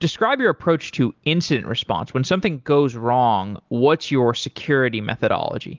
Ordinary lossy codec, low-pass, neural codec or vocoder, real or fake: Opus, 24 kbps; 7.2 kHz; none; real